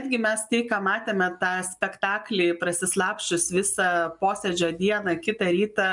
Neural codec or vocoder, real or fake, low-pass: none; real; 10.8 kHz